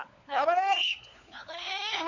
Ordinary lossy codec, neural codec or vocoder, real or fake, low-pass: none; codec, 16 kHz, 4 kbps, FunCodec, trained on LibriTTS, 50 frames a second; fake; 7.2 kHz